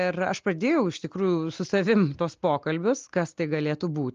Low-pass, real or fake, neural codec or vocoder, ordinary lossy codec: 7.2 kHz; real; none; Opus, 32 kbps